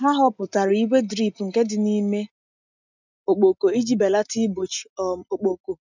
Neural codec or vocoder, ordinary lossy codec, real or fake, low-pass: none; none; real; 7.2 kHz